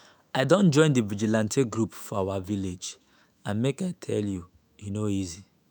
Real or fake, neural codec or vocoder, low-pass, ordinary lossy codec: fake; autoencoder, 48 kHz, 128 numbers a frame, DAC-VAE, trained on Japanese speech; none; none